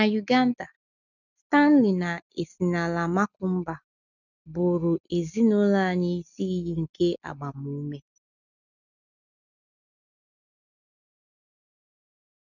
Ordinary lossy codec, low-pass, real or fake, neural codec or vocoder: none; 7.2 kHz; real; none